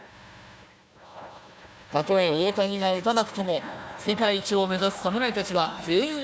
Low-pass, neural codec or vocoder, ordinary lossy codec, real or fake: none; codec, 16 kHz, 1 kbps, FunCodec, trained on Chinese and English, 50 frames a second; none; fake